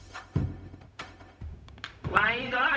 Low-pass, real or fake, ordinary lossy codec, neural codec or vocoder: none; fake; none; codec, 16 kHz, 0.4 kbps, LongCat-Audio-Codec